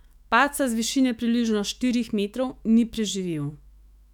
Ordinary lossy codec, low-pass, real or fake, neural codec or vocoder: none; 19.8 kHz; fake; autoencoder, 48 kHz, 128 numbers a frame, DAC-VAE, trained on Japanese speech